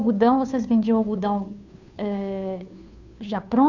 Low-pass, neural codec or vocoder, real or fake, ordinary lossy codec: 7.2 kHz; codec, 16 kHz, 2 kbps, FunCodec, trained on Chinese and English, 25 frames a second; fake; none